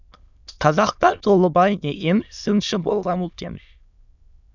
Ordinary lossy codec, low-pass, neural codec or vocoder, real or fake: none; 7.2 kHz; autoencoder, 22.05 kHz, a latent of 192 numbers a frame, VITS, trained on many speakers; fake